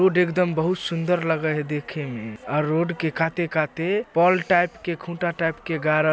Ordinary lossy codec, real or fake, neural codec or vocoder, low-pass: none; real; none; none